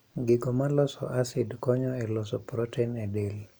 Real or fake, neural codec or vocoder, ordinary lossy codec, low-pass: real; none; none; none